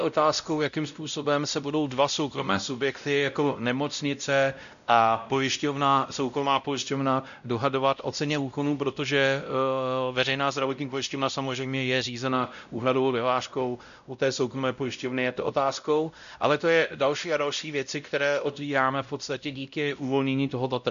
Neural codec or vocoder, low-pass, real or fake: codec, 16 kHz, 0.5 kbps, X-Codec, WavLM features, trained on Multilingual LibriSpeech; 7.2 kHz; fake